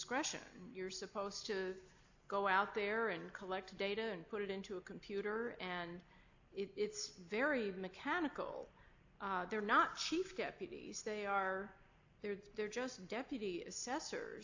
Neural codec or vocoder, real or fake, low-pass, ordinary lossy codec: none; real; 7.2 kHz; AAC, 48 kbps